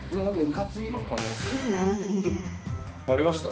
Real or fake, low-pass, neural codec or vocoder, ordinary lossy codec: fake; none; codec, 16 kHz, 2 kbps, X-Codec, HuBERT features, trained on balanced general audio; none